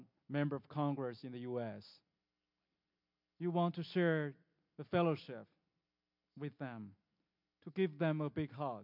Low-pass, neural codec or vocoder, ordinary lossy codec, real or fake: 5.4 kHz; none; MP3, 48 kbps; real